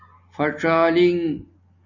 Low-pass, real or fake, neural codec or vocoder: 7.2 kHz; real; none